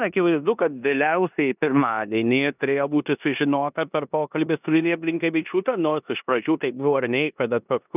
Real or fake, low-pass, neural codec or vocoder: fake; 3.6 kHz; codec, 16 kHz in and 24 kHz out, 0.9 kbps, LongCat-Audio-Codec, four codebook decoder